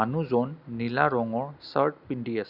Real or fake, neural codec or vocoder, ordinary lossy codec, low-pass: real; none; Opus, 64 kbps; 5.4 kHz